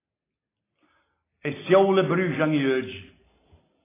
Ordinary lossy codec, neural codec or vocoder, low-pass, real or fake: AAC, 16 kbps; none; 3.6 kHz; real